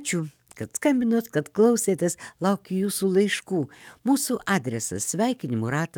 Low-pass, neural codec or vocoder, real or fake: 19.8 kHz; codec, 44.1 kHz, 7.8 kbps, DAC; fake